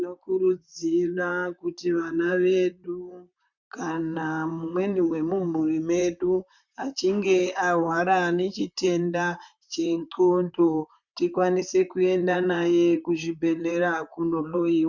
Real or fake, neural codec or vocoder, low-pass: fake; vocoder, 44.1 kHz, 128 mel bands, Pupu-Vocoder; 7.2 kHz